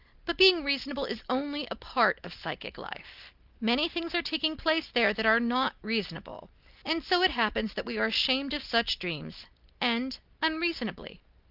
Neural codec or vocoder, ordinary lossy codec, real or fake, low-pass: none; Opus, 32 kbps; real; 5.4 kHz